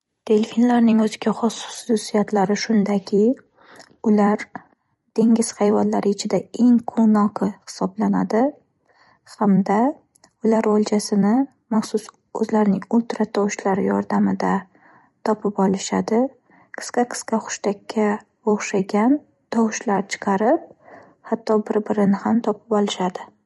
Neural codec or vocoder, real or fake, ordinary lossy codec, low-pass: vocoder, 44.1 kHz, 128 mel bands every 256 samples, BigVGAN v2; fake; MP3, 48 kbps; 19.8 kHz